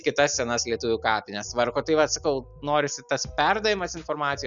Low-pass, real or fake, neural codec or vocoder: 7.2 kHz; real; none